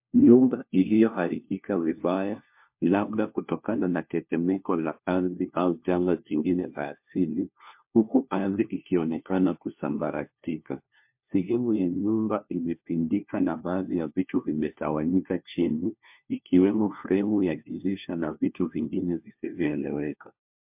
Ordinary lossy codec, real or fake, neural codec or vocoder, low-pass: MP3, 32 kbps; fake; codec, 16 kHz, 1 kbps, FunCodec, trained on LibriTTS, 50 frames a second; 3.6 kHz